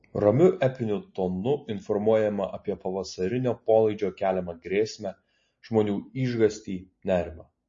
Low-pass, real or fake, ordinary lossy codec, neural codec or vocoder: 7.2 kHz; real; MP3, 32 kbps; none